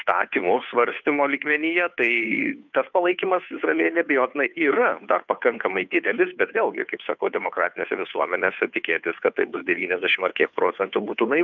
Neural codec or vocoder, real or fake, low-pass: codec, 16 kHz, 2 kbps, FunCodec, trained on Chinese and English, 25 frames a second; fake; 7.2 kHz